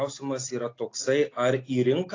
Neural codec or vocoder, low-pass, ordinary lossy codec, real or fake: none; 7.2 kHz; AAC, 32 kbps; real